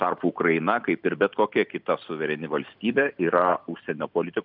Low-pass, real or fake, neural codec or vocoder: 5.4 kHz; real; none